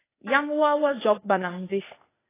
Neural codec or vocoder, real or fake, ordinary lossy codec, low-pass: codec, 16 kHz, 0.8 kbps, ZipCodec; fake; AAC, 16 kbps; 3.6 kHz